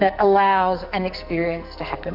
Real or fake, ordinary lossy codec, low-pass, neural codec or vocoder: fake; AAC, 48 kbps; 5.4 kHz; codec, 44.1 kHz, 2.6 kbps, SNAC